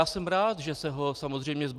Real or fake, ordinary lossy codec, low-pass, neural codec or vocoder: real; Opus, 32 kbps; 14.4 kHz; none